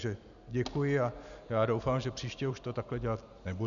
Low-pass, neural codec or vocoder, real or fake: 7.2 kHz; none; real